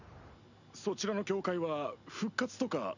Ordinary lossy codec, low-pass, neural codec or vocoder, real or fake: none; 7.2 kHz; none; real